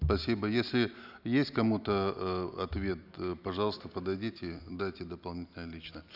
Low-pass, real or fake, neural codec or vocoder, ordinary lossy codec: 5.4 kHz; real; none; none